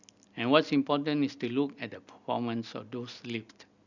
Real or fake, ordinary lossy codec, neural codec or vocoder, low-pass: real; none; none; 7.2 kHz